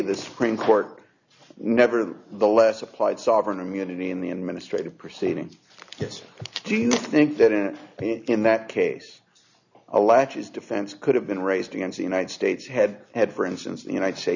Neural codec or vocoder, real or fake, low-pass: none; real; 7.2 kHz